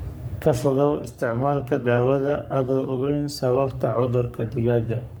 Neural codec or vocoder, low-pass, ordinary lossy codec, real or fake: codec, 44.1 kHz, 3.4 kbps, Pupu-Codec; none; none; fake